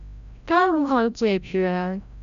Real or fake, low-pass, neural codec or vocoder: fake; 7.2 kHz; codec, 16 kHz, 0.5 kbps, FreqCodec, larger model